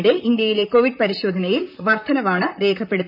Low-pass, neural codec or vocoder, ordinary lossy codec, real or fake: 5.4 kHz; vocoder, 44.1 kHz, 128 mel bands, Pupu-Vocoder; none; fake